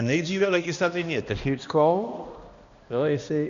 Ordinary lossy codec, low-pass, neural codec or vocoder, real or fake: Opus, 64 kbps; 7.2 kHz; codec, 16 kHz, 1 kbps, X-Codec, HuBERT features, trained on balanced general audio; fake